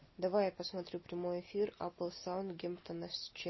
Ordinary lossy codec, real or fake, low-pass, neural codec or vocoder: MP3, 24 kbps; real; 7.2 kHz; none